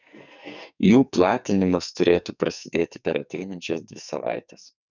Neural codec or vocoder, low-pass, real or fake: codec, 44.1 kHz, 2.6 kbps, SNAC; 7.2 kHz; fake